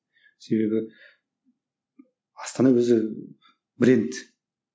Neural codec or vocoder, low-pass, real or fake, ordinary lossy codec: none; none; real; none